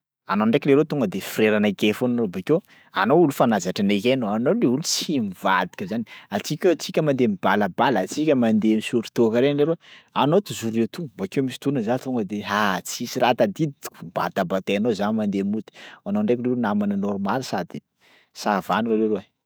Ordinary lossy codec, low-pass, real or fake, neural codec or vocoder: none; none; fake; autoencoder, 48 kHz, 128 numbers a frame, DAC-VAE, trained on Japanese speech